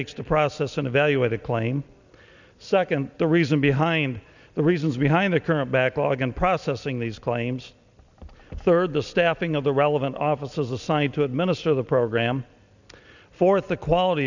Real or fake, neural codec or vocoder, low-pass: real; none; 7.2 kHz